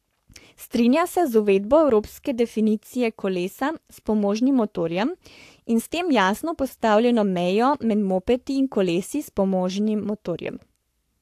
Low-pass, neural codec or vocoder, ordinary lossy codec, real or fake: 14.4 kHz; codec, 44.1 kHz, 7.8 kbps, Pupu-Codec; AAC, 64 kbps; fake